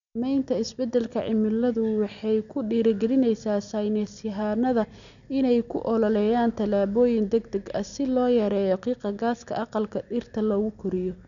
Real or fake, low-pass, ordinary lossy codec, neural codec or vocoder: real; 7.2 kHz; none; none